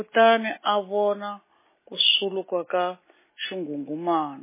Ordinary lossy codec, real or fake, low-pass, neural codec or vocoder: MP3, 16 kbps; real; 3.6 kHz; none